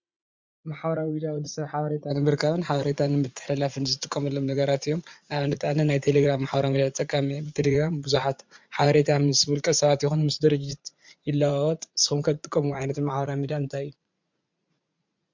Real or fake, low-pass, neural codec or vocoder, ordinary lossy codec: fake; 7.2 kHz; vocoder, 44.1 kHz, 80 mel bands, Vocos; MP3, 64 kbps